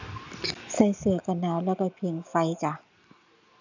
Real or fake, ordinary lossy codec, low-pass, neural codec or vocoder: real; none; 7.2 kHz; none